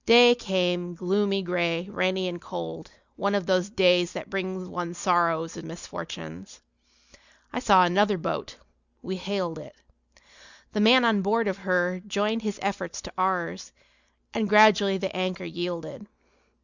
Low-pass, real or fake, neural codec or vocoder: 7.2 kHz; real; none